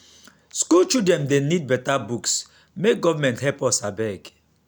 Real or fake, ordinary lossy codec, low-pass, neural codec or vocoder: real; none; none; none